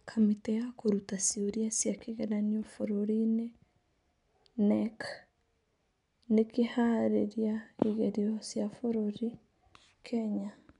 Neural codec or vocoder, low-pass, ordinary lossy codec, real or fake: none; 10.8 kHz; MP3, 96 kbps; real